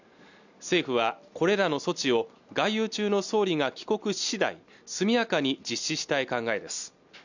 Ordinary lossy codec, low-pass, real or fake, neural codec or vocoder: none; 7.2 kHz; real; none